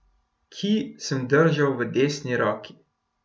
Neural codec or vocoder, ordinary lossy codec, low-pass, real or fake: none; none; none; real